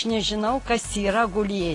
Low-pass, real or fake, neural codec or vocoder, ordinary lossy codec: 10.8 kHz; real; none; AAC, 32 kbps